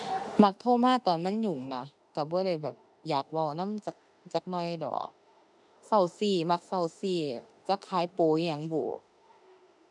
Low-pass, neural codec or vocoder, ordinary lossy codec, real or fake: 10.8 kHz; autoencoder, 48 kHz, 32 numbers a frame, DAC-VAE, trained on Japanese speech; none; fake